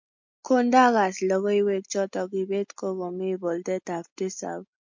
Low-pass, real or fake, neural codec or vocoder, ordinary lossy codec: 7.2 kHz; real; none; MP3, 48 kbps